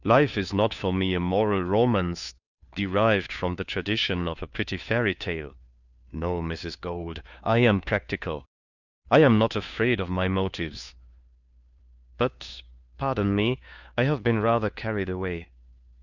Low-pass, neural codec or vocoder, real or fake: 7.2 kHz; codec, 16 kHz, 2 kbps, FunCodec, trained on Chinese and English, 25 frames a second; fake